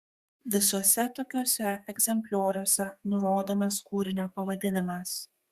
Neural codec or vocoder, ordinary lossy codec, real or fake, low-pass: codec, 32 kHz, 1.9 kbps, SNAC; Opus, 64 kbps; fake; 14.4 kHz